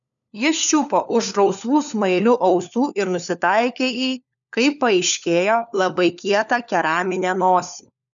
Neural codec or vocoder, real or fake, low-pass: codec, 16 kHz, 4 kbps, FunCodec, trained on LibriTTS, 50 frames a second; fake; 7.2 kHz